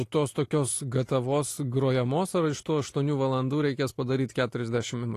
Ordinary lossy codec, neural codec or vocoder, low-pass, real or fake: AAC, 48 kbps; none; 14.4 kHz; real